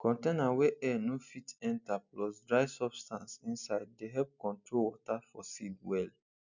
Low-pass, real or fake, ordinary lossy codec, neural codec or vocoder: 7.2 kHz; real; none; none